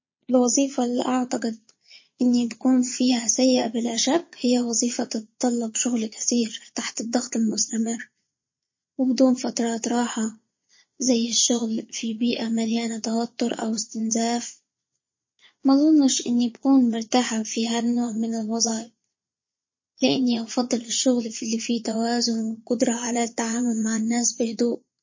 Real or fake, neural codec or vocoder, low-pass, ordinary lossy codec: fake; vocoder, 22.05 kHz, 80 mel bands, Vocos; 7.2 kHz; MP3, 32 kbps